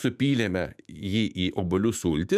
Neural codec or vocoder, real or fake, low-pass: autoencoder, 48 kHz, 128 numbers a frame, DAC-VAE, trained on Japanese speech; fake; 14.4 kHz